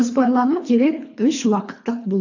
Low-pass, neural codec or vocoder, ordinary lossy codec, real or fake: 7.2 kHz; codec, 24 kHz, 3 kbps, HILCodec; AAC, 48 kbps; fake